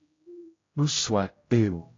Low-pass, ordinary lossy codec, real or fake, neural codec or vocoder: 7.2 kHz; AAC, 32 kbps; fake; codec, 16 kHz, 0.5 kbps, X-Codec, HuBERT features, trained on general audio